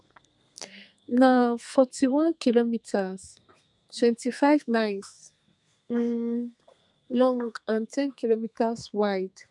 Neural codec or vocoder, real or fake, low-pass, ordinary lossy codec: codec, 32 kHz, 1.9 kbps, SNAC; fake; 10.8 kHz; none